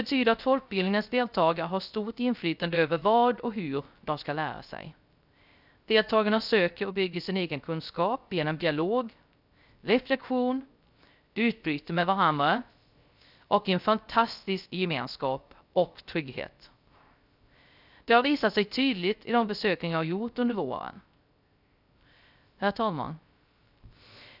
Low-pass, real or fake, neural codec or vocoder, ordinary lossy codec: 5.4 kHz; fake; codec, 16 kHz, 0.3 kbps, FocalCodec; none